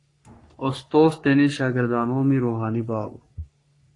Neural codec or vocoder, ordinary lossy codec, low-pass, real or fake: codec, 44.1 kHz, 3.4 kbps, Pupu-Codec; AAC, 48 kbps; 10.8 kHz; fake